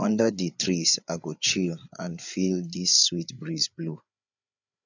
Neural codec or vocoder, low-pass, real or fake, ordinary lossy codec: codec, 16 kHz, 8 kbps, FreqCodec, larger model; 7.2 kHz; fake; none